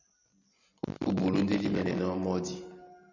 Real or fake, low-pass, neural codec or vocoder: real; 7.2 kHz; none